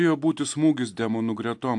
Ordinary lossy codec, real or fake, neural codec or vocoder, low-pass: AAC, 64 kbps; real; none; 10.8 kHz